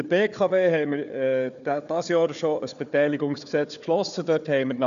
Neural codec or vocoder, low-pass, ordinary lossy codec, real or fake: codec, 16 kHz, 4 kbps, FunCodec, trained on Chinese and English, 50 frames a second; 7.2 kHz; none; fake